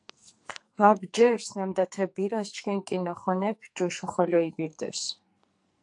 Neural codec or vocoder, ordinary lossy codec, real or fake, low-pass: codec, 44.1 kHz, 2.6 kbps, SNAC; AAC, 64 kbps; fake; 10.8 kHz